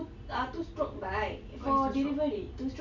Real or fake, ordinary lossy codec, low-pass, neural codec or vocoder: real; none; 7.2 kHz; none